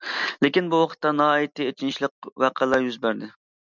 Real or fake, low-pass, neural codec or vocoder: real; 7.2 kHz; none